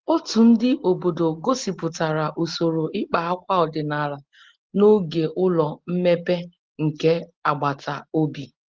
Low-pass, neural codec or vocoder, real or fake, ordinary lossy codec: 7.2 kHz; none; real; Opus, 16 kbps